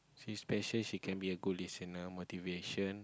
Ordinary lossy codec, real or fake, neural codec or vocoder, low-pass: none; real; none; none